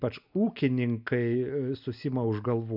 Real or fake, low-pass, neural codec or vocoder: real; 5.4 kHz; none